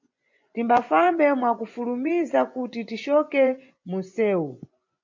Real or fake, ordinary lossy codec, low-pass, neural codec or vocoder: fake; MP3, 48 kbps; 7.2 kHz; vocoder, 24 kHz, 100 mel bands, Vocos